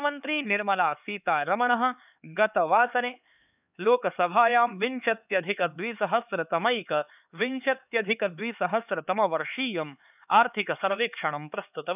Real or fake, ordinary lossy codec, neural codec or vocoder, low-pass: fake; none; codec, 16 kHz, 4 kbps, X-Codec, HuBERT features, trained on LibriSpeech; 3.6 kHz